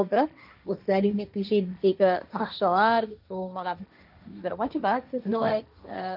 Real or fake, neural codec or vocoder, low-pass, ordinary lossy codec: fake; codec, 16 kHz, 1.1 kbps, Voila-Tokenizer; 5.4 kHz; none